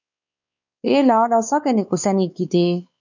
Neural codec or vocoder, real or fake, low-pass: codec, 16 kHz, 4 kbps, X-Codec, WavLM features, trained on Multilingual LibriSpeech; fake; 7.2 kHz